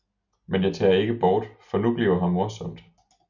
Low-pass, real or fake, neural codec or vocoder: 7.2 kHz; real; none